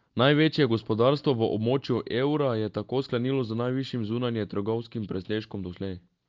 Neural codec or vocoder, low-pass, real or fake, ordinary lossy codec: none; 7.2 kHz; real; Opus, 24 kbps